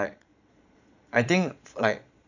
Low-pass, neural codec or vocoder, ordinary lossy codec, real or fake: 7.2 kHz; vocoder, 22.05 kHz, 80 mel bands, Vocos; none; fake